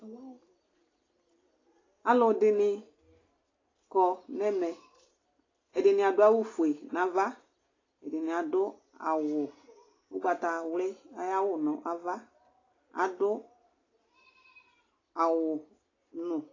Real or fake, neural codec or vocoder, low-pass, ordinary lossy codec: real; none; 7.2 kHz; AAC, 32 kbps